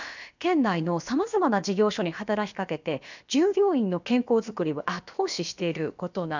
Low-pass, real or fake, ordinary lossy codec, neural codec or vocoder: 7.2 kHz; fake; none; codec, 16 kHz, about 1 kbps, DyCAST, with the encoder's durations